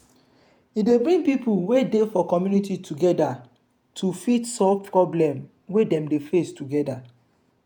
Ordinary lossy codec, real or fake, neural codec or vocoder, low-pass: none; fake; vocoder, 48 kHz, 128 mel bands, Vocos; none